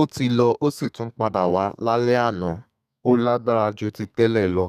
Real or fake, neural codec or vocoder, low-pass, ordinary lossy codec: fake; codec, 32 kHz, 1.9 kbps, SNAC; 14.4 kHz; none